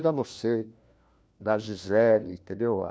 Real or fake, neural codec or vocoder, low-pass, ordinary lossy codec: fake; codec, 16 kHz, 1 kbps, FunCodec, trained on LibriTTS, 50 frames a second; none; none